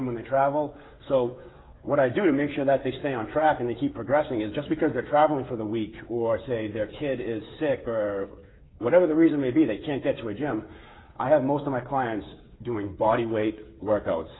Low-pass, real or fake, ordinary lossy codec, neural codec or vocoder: 7.2 kHz; fake; AAC, 16 kbps; codec, 16 kHz, 8 kbps, FreqCodec, smaller model